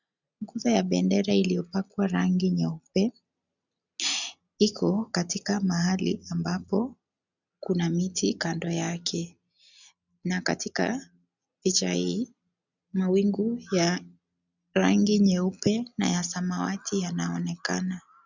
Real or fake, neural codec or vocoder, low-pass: real; none; 7.2 kHz